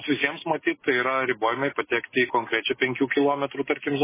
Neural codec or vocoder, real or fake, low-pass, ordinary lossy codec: none; real; 3.6 kHz; MP3, 16 kbps